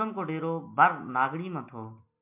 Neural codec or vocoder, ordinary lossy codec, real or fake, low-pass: none; MP3, 32 kbps; real; 3.6 kHz